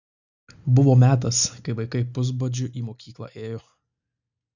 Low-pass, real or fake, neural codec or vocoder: 7.2 kHz; real; none